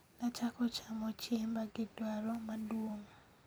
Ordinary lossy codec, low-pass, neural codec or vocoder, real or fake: none; none; vocoder, 44.1 kHz, 128 mel bands every 256 samples, BigVGAN v2; fake